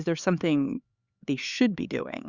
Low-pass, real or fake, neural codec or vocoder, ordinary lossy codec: 7.2 kHz; fake; codec, 16 kHz, 4 kbps, X-Codec, HuBERT features, trained on LibriSpeech; Opus, 64 kbps